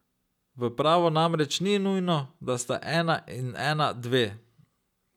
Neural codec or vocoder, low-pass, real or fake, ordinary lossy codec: none; 19.8 kHz; real; none